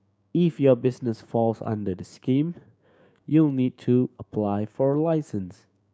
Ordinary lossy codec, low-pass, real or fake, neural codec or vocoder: none; none; fake; codec, 16 kHz, 6 kbps, DAC